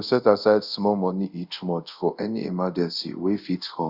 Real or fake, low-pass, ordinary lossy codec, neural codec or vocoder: fake; 5.4 kHz; Opus, 64 kbps; codec, 24 kHz, 0.5 kbps, DualCodec